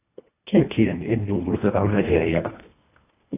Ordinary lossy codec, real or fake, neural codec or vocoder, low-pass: AAC, 24 kbps; fake; codec, 24 kHz, 1.5 kbps, HILCodec; 3.6 kHz